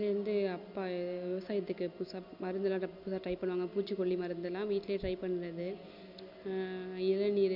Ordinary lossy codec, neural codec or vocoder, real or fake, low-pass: none; none; real; 5.4 kHz